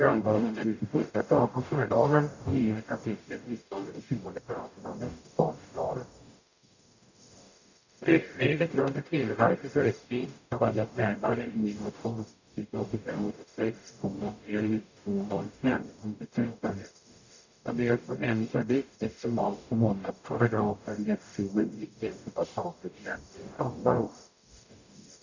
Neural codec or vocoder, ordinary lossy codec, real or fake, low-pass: codec, 44.1 kHz, 0.9 kbps, DAC; none; fake; 7.2 kHz